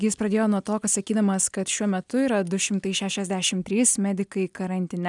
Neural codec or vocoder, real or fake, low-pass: none; real; 10.8 kHz